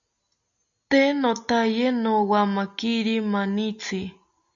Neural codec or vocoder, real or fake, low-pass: none; real; 7.2 kHz